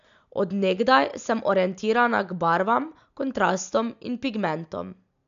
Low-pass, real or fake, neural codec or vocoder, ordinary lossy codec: 7.2 kHz; real; none; none